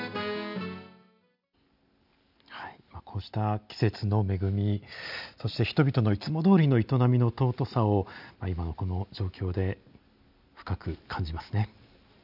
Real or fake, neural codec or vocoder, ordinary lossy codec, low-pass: real; none; none; 5.4 kHz